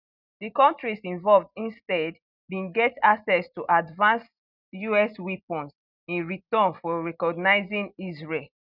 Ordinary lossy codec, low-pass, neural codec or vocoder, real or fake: none; 5.4 kHz; none; real